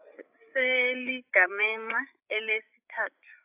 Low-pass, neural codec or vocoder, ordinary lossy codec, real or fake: 3.6 kHz; codec, 16 kHz, 8 kbps, FreqCodec, larger model; AAC, 32 kbps; fake